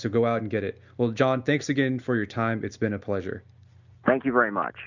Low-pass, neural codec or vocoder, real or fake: 7.2 kHz; none; real